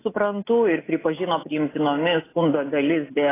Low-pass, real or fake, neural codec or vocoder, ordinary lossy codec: 3.6 kHz; real; none; AAC, 16 kbps